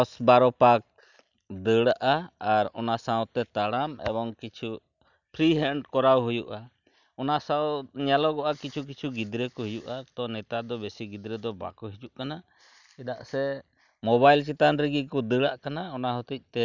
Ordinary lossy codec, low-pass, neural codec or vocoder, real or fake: none; 7.2 kHz; none; real